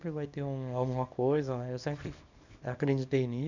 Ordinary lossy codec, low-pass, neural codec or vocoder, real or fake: none; 7.2 kHz; codec, 24 kHz, 0.9 kbps, WavTokenizer, small release; fake